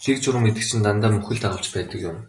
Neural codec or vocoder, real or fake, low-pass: none; real; 10.8 kHz